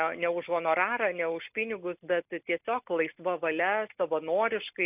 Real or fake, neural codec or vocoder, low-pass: real; none; 3.6 kHz